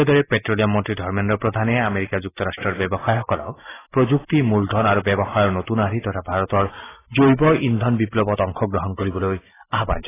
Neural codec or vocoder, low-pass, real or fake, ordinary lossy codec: none; 3.6 kHz; real; AAC, 16 kbps